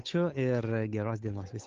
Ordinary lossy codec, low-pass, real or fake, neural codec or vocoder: Opus, 32 kbps; 7.2 kHz; fake; codec, 16 kHz, 8 kbps, FunCodec, trained on Chinese and English, 25 frames a second